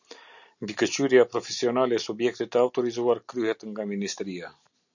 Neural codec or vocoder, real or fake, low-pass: none; real; 7.2 kHz